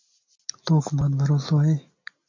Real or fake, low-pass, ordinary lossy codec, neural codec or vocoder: real; 7.2 kHz; AAC, 48 kbps; none